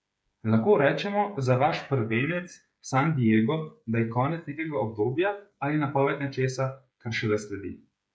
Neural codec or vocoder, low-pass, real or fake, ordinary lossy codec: codec, 16 kHz, 8 kbps, FreqCodec, smaller model; none; fake; none